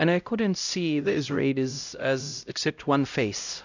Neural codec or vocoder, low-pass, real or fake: codec, 16 kHz, 0.5 kbps, X-Codec, HuBERT features, trained on LibriSpeech; 7.2 kHz; fake